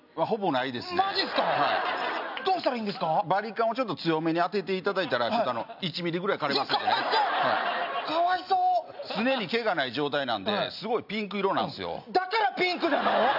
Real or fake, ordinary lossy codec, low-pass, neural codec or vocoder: real; none; 5.4 kHz; none